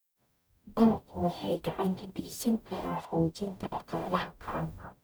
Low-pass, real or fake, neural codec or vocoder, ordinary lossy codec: none; fake; codec, 44.1 kHz, 0.9 kbps, DAC; none